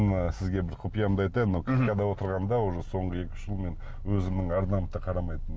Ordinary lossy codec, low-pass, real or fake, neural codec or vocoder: none; none; real; none